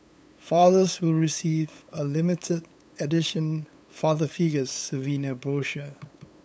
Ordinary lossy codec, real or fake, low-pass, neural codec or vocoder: none; fake; none; codec, 16 kHz, 8 kbps, FunCodec, trained on LibriTTS, 25 frames a second